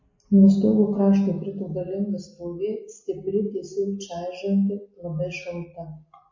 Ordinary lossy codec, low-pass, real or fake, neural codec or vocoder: MP3, 32 kbps; 7.2 kHz; real; none